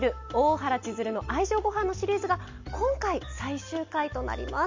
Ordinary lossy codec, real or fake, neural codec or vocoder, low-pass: MP3, 48 kbps; real; none; 7.2 kHz